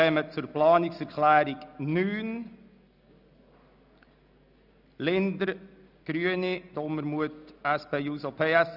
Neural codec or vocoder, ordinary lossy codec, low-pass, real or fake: none; none; 5.4 kHz; real